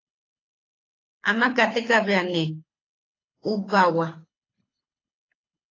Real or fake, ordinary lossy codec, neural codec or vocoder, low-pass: fake; AAC, 32 kbps; codec, 24 kHz, 6 kbps, HILCodec; 7.2 kHz